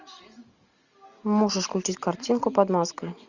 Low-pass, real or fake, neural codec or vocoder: 7.2 kHz; real; none